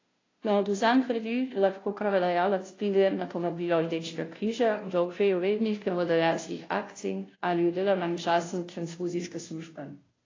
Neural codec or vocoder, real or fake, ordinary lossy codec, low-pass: codec, 16 kHz, 0.5 kbps, FunCodec, trained on Chinese and English, 25 frames a second; fake; AAC, 32 kbps; 7.2 kHz